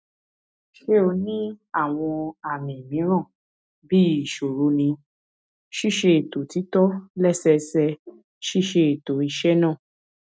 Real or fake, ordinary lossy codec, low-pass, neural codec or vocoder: real; none; none; none